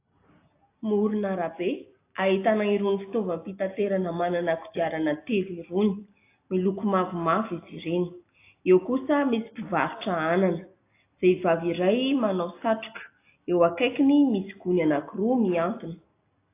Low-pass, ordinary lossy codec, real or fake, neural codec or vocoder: 3.6 kHz; AAC, 24 kbps; real; none